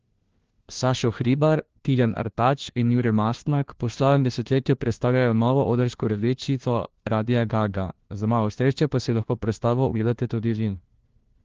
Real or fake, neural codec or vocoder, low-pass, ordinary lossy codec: fake; codec, 16 kHz, 1 kbps, FunCodec, trained on LibriTTS, 50 frames a second; 7.2 kHz; Opus, 16 kbps